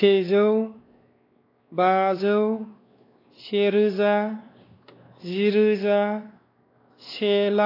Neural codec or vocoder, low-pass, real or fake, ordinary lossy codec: codec, 16 kHz, 2 kbps, X-Codec, WavLM features, trained on Multilingual LibriSpeech; 5.4 kHz; fake; AAC, 24 kbps